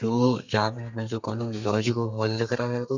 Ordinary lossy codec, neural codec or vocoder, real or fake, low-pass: none; codec, 32 kHz, 1.9 kbps, SNAC; fake; 7.2 kHz